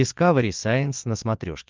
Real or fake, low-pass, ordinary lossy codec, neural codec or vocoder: fake; 7.2 kHz; Opus, 32 kbps; vocoder, 22.05 kHz, 80 mel bands, Vocos